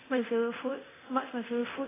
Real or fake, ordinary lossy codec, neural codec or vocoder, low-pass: fake; AAC, 16 kbps; codec, 24 kHz, 0.9 kbps, DualCodec; 3.6 kHz